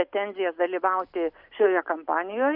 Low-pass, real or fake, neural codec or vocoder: 5.4 kHz; real; none